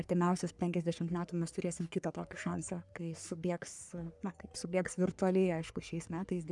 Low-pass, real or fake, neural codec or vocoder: 10.8 kHz; fake; codec, 44.1 kHz, 3.4 kbps, Pupu-Codec